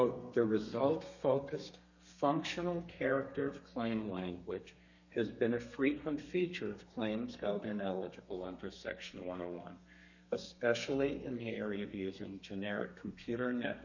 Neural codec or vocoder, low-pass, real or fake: codec, 32 kHz, 1.9 kbps, SNAC; 7.2 kHz; fake